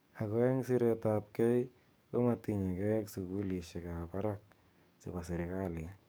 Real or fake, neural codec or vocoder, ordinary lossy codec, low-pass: fake; codec, 44.1 kHz, 7.8 kbps, DAC; none; none